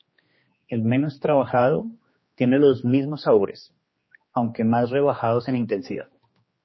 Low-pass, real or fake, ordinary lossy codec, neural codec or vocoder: 7.2 kHz; fake; MP3, 24 kbps; codec, 16 kHz, 2 kbps, X-Codec, HuBERT features, trained on general audio